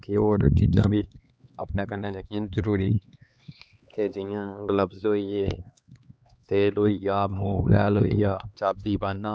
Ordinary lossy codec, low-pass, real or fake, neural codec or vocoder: none; none; fake; codec, 16 kHz, 2 kbps, X-Codec, HuBERT features, trained on LibriSpeech